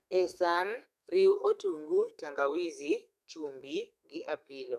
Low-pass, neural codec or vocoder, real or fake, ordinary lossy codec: 14.4 kHz; codec, 32 kHz, 1.9 kbps, SNAC; fake; none